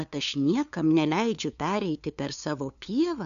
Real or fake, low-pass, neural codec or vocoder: fake; 7.2 kHz; codec, 16 kHz, 2 kbps, FunCodec, trained on LibriTTS, 25 frames a second